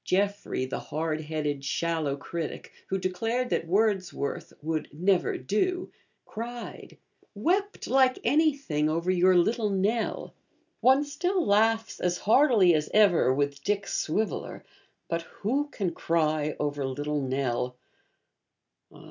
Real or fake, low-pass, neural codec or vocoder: real; 7.2 kHz; none